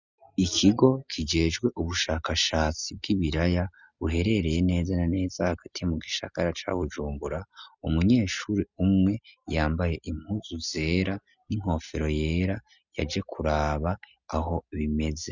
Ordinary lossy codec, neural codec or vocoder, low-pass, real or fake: Opus, 64 kbps; none; 7.2 kHz; real